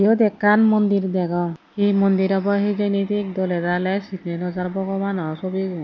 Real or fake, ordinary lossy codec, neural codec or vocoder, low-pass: real; none; none; 7.2 kHz